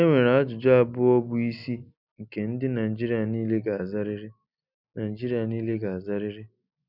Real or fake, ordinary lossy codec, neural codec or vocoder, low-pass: real; none; none; 5.4 kHz